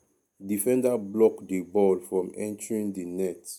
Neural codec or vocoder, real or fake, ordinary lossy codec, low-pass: none; real; none; 19.8 kHz